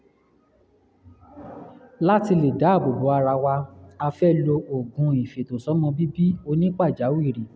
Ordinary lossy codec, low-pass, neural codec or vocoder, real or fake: none; none; none; real